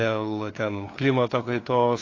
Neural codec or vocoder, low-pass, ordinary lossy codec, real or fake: codec, 16 kHz, 2 kbps, FunCodec, trained on LibriTTS, 25 frames a second; 7.2 kHz; AAC, 32 kbps; fake